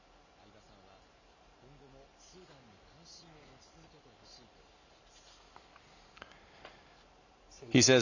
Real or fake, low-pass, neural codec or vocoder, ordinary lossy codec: real; 7.2 kHz; none; none